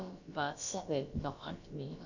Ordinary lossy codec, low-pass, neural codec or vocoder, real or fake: none; 7.2 kHz; codec, 16 kHz, about 1 kbps, DyCAST, with the encoder's durations; fake